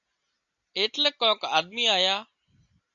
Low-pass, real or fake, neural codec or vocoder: 7.2 kHz; real; none